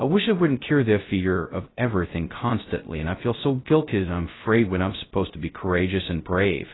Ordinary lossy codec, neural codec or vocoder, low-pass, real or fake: AAC, 16 kbps; codec, 16 kHz, 0.2 kbps, FocalCodec; 7.2 kHz; fake